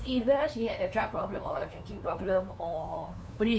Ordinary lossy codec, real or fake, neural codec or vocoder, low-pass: none; fake; codec, 16 kHz, 2 kbps, FunCodec, trained on LibriTTS, 25 frames a second; none